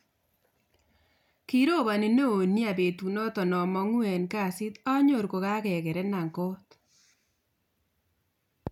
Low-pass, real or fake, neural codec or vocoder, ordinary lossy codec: 19.8 kHz; real; none; none